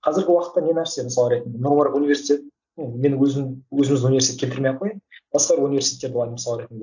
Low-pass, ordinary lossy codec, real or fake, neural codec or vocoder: 7.2 kHz; none; real; none